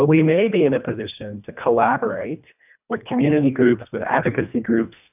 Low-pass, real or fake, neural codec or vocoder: 3.6 kHz; fake; codec, 24 kHz, 1.5 kbps, HILCodec